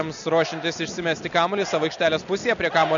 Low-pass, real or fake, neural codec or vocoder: 7.2 kHz; real; none